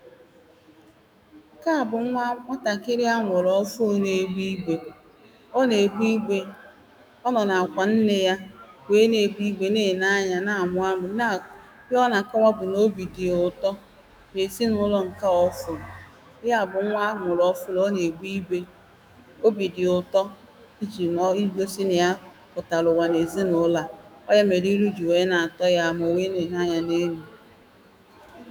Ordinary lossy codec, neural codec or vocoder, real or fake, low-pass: none; autoencoder, 48 kHz, 128 numbers a frame, DAC-VAE, trained on Japanese speech; fake; none